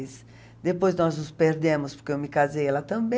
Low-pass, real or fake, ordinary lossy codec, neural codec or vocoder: none; real; none; none